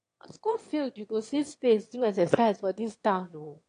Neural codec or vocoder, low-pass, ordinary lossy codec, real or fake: autoencoder, 22.05 kHz, a latent of 192 numbers a frame, VITS, trained on one speaker; 9.9 kHz; AAC, 48 kbps; fake